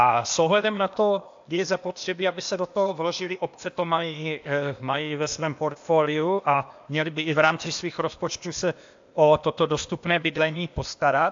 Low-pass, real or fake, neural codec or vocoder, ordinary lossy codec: 7.2 kHz; fake; codec, 16 kHz, 0.8 kbps, ZipCodec; AAC, 64 kbps